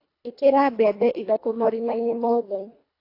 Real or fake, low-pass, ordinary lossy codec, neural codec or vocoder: fake; 5.4 kHz; AAC, 32 kbps; codec, 24 kHz, 1.5 kbps, HILCodec